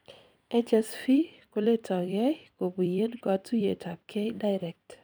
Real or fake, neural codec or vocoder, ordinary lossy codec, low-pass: fake; vocoder, 44.1 kHz, 128 mel bands every 256 samples, BigVGAN v2; none; none